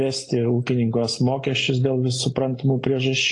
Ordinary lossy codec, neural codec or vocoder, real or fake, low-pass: AAC, 48 kbps; none; real; 10.8 kHz